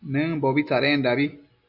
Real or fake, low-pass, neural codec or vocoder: real; 5.4 kHz; none